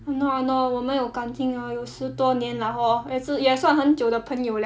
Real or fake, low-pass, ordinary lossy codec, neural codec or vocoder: real; none; none; none